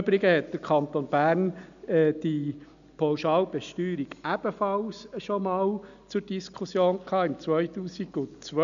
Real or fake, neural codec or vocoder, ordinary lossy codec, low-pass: real; none; none; 7.2 kHz